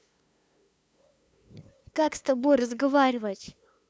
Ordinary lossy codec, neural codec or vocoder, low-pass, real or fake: none; codec, 16 kHz, 2 kbps, FunCodec, trained on LibriTTS, 25 frames a second; none; fake